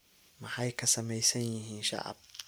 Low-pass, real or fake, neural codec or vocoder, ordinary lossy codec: none; real; none; none